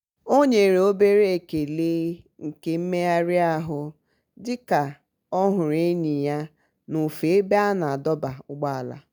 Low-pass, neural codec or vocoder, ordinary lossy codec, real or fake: none; none; none; real